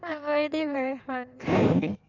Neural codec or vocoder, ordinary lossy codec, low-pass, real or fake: codec, 16 kHz in and 24 kHz out, 1.1 kbps, FireRedTTS-2 codec; none; 7.2 kHz; fake